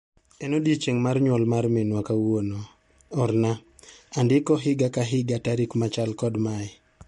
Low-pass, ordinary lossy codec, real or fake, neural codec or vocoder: 19.8 kHz; MP3, 48 kbps; real; none